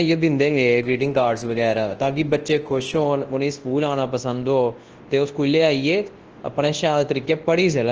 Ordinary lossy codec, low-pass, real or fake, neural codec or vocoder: Opus, 16 kbps; 7.2 kHz; fake; codec, 24 kHz, 0.9 kbps, WavTokenizer, large speech release